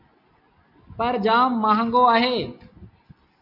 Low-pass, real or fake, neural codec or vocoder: 5.4 kHz; real; none